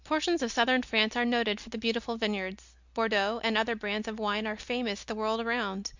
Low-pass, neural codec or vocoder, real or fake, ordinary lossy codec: 7.2 kHz; none; real; Opus, 64 kbps